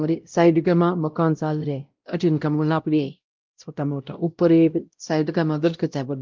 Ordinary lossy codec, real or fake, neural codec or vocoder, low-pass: Opus, 24 kbps; fake; codec, 16 kHz, 0.5 kbps, X-Codec, WavLM features, trained on Multilingual LibriSpeech; 7.2 kHz